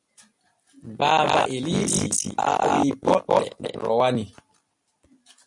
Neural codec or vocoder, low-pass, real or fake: none; 10.8 kHz; real